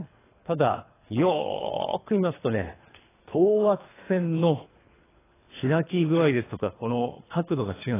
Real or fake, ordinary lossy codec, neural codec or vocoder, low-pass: fake; AAC, 16 kbps; codec, 24 kHz, 3 kbps, HILCodec; 3.6 kHz